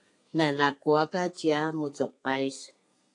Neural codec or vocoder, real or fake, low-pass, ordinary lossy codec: codec, 32 kHz, 1.9 kbps, SNAC; fake; 10.8 kHz; AAC, 48 kbps